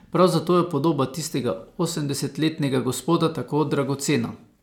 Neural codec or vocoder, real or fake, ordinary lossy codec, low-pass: none; real; none; 19.8 kHz